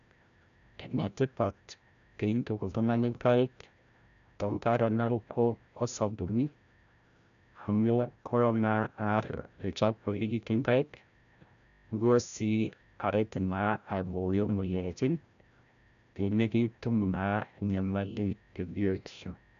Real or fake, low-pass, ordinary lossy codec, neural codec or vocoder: fake; 7.2 kHz; none; codec, 16 kHz, 0.5 kbps, FreqCodec, larger model